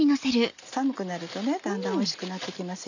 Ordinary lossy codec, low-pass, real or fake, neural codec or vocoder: none; 7.2 kHz; real; none